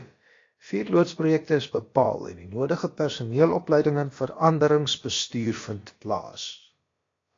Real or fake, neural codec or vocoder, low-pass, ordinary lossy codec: fake; codec, 16 kHz, about 1 kbps, DyCAST, with the encoder's durations; 7.2 kHz; AAC, 32 kbps